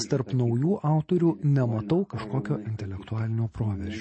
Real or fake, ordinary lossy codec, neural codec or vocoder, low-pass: real; MP3, 32 kbps; none; 9.9 kHz